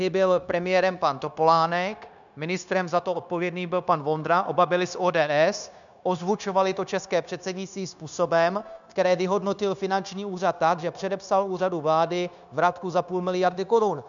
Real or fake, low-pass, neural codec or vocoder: fake; 7.2 kHz; codec, 16 kHz, 0.9 kbps, LongCat-Audio-Codec